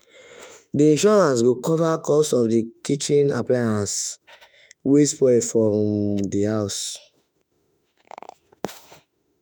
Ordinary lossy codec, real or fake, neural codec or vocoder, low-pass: none; fake; autoencoder, 48 kHz, 32 numbers a frame, DAC-VAE, trained on Japanese speech; none